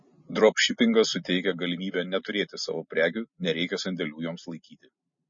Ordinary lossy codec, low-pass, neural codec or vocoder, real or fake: MP3, 32 kbps; 7.2 kHz; none; real